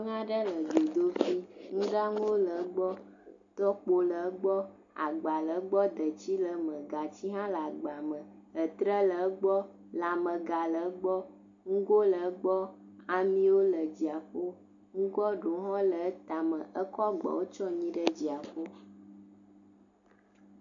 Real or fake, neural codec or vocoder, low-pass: real; none; 7.2 kHz